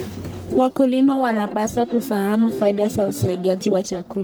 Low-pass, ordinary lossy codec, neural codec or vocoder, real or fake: none; none; codec, 44.1 kHz, 1.7 kbps, Pupu-Codec; fake